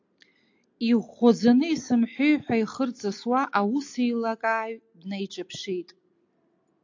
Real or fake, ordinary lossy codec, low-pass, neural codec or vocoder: real; AAC, 48 kbps; 7.2 kHz; none